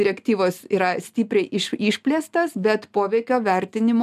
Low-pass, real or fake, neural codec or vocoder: 14.4 kHz; real; none